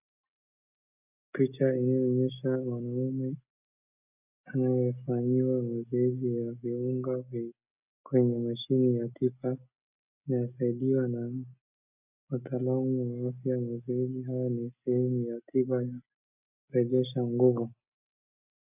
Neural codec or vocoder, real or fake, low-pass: none; real; 3.6 kHz